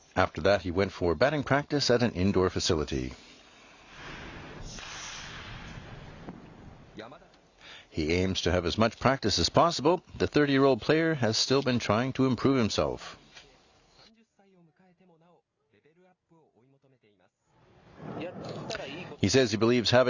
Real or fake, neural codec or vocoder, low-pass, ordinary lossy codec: real; none; 7.2 kHz; Opus, 64 kbps